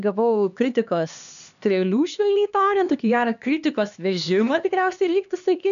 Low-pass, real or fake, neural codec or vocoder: 7.2 kHz; fake; codec, 16 kHz, 2 kbps, X-Codec, HuBERT features, trained on LibriSpeech